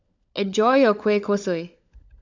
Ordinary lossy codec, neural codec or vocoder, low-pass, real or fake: none; codec, 16 kHz, 16 kbps, FunCodec, trained on LibriTTS, 50 frames a second; 7.2 kHz; fake